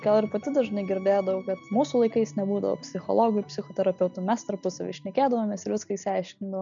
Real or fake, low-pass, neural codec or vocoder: real; 7.2 kHz; none